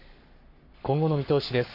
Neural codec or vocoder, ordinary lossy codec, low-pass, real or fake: codec, 44.1 kHz, 7.8 kbps, Pupu-Codec; none; 5.4 kHz; fake